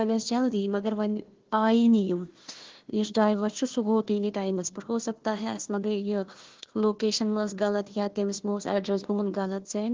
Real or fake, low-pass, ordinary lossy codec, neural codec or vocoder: fake; 7.2 kHz; Opus, 16 kbps; codec, 16 kHz, 1 kbps, FunCodec, trained on Chinese and English, 50 frames a second